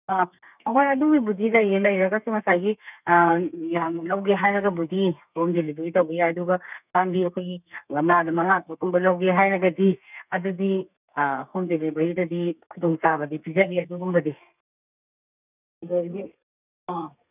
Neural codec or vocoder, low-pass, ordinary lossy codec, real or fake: codec, 32 kHz, 1.9 kbps, SNAC; 3.6 kHz; none; fake